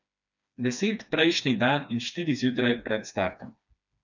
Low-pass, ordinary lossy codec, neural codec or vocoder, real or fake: 7.2 kHz; none; codec, 16 kHz, 2 kbps, FreqCodec, smaller model; fake